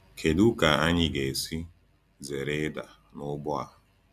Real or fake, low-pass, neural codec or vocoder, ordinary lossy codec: real; 14.4 kHz; none; none